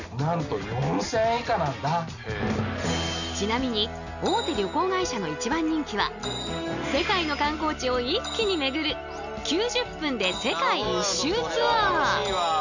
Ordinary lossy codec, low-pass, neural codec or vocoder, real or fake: none; 7.2 kHz; none; real